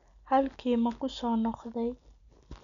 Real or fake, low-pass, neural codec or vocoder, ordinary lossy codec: real; 7.2 kHz; none; none